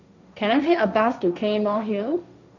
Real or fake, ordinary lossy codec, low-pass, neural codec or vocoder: fake; none; 7.2 kHz; codec, 16 kHz, 1.1 kbps, Voila-Tokenizer